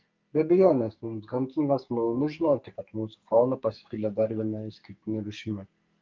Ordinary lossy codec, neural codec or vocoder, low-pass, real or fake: Opus, 24 kbps; codec, 44.1 kHz, 2.6 kbps, SNAC; 7.2 kHz; fake